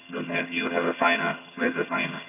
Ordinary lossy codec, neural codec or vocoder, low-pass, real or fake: none; vocoder, 22.05 kHz, 80 mel bands, HiFi-GAN; 3.6 kHz; fake